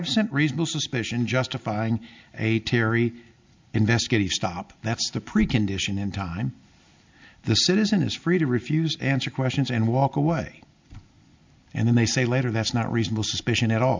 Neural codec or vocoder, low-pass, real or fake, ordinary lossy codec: none; 7.2 kHz; real; MP3, 64 kbps